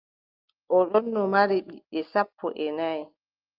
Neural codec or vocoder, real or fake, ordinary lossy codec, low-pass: none; real; Opus, 32 kbps; 5.4 kHz